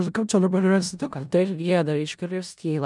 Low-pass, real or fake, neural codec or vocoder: 10.8 kHz; fake; codec, 16 kHz in and 24 kHz out, 0.4 kbps, LongCat-Audio-Codec, four codebook decoder